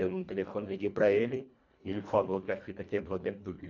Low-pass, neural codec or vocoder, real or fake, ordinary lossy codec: 7.2 kHz; codec, 24 kHz, 1.5 kbps, HILCodec; fake; none